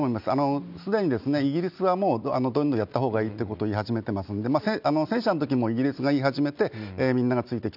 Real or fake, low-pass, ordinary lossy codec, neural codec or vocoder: real; 5.4 kHz; none; none